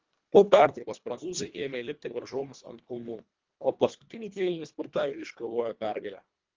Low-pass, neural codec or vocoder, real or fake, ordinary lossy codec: 7.2 kHz; codec, 24 kHz, 1.5 kbps, HILCodec; fake; Opus, 32 kbps